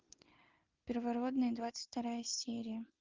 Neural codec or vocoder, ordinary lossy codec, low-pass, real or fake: none; Opus, 24 kbps; 7.2 kHz; real